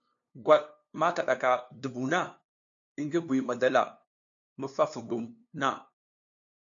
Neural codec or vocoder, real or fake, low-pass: codec, 16 kHz, 2 kbps, FunCodec, trained on LibriTTS, 25 frames a second; fake; 7.2 kHz